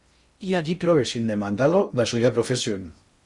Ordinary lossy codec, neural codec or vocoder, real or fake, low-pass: Opus, 64 kbps; codec, 16 kHz in and 24 kHz out, 0.6 kbps, FocalCodec, streaming, 2048 codes; fake; 10.8 kHz